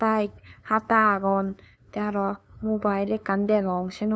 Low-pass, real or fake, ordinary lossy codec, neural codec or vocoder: none; fake; none; codec, 16 kHz, 2 kbps, FunCodec, trained on LibriTTS, 25 frames a second